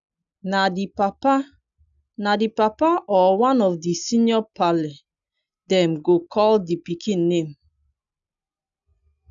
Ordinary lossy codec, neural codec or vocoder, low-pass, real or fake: none; none; 7.2 kHz; real